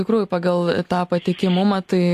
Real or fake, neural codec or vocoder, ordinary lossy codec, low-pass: real; none; AAC, 64 kbps; 14.4 kHz